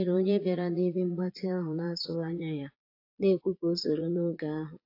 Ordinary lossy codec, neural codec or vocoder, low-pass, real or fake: AAC, 32 kbps; vocoder, 22.05 kHz, 80 mel bands, Vocos; 5.4 kHz; fake